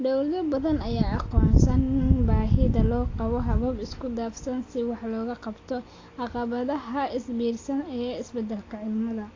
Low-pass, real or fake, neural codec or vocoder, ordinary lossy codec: 7.2 kHz; real; none; AAC, 32 kbps